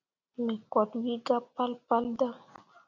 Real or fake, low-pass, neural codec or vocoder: real; 7.2 kHz; none